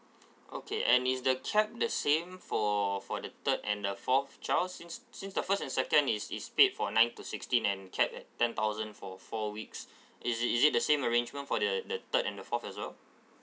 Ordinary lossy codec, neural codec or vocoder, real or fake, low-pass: none; none; real; none